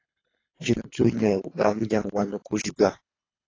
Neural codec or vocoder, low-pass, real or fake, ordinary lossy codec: codec, 24 kHz, 3 kbps, HILCodec; 7.2 kHz; fake; AAC, 32 kbps